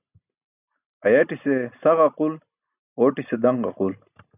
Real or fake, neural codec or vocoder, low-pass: real; none; 3.6 kHz